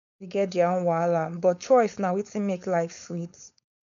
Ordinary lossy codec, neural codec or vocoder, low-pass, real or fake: none; codec, 16 kHz, 4.8 kbps, FACodec; 7.2 kHz; fake